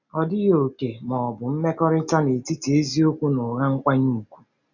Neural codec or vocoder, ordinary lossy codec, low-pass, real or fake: none; none; 7.2 kHz; real